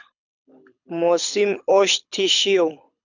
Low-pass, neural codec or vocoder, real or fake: 7.2 kHz; codec, 24 kHz, 6 kbps, HILCodec; fake